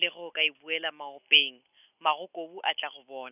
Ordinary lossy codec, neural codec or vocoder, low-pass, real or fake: none; none; 3.6 kHz; real